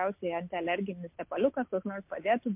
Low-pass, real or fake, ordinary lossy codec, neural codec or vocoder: 3.6 kHz; real; MP3, 32 kbps; none